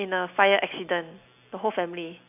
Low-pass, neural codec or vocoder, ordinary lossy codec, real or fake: 3.6 kHz; none; none; real